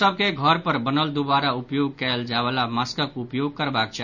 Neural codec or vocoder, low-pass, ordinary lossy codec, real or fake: none; 7.2 kHz; none; real